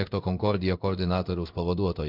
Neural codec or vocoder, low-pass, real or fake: codec, 16 kHz in and 24 kHz out, 1 kbps, XY-Tokenizer; 5.4 kHz; fake